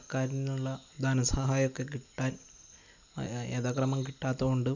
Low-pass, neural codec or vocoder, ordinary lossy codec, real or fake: 7.2 kHz; none; none; real